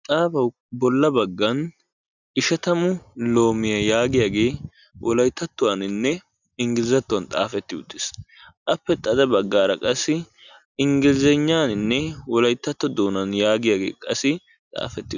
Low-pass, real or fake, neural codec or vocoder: 7.2 kHz; real; none